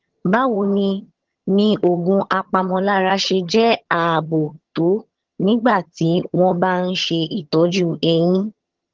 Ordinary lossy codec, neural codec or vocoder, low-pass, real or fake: Opus, 16 kbps; vocoder, 22.05 kHz, 80 mel bands, HiFi-GAN; 7.2 kHz; fake